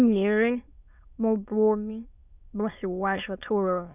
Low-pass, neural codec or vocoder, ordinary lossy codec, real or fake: 3.6 kHz; autoencoder, 22.05 kHz, a latent of 192 numbers a frame, VITS, trained on many speakers; none; fake